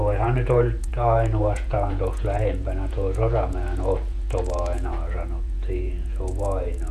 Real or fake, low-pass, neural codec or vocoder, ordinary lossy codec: fake; 14.4 kHz; vocoder, 48 kHz, 128 mel bands, Vocos; Opus, 64 kbps